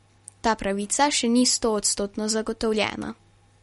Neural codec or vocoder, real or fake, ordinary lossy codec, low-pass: none; real; MP3, 48 kbps; 19.8 kHz